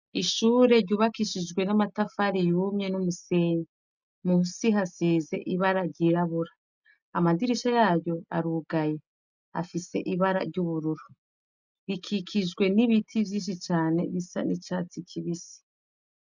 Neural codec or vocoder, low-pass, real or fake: none; 7.2 kHz; real